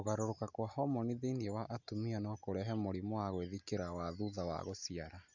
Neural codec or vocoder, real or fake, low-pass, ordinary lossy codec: none; real; 7.2 kHz; none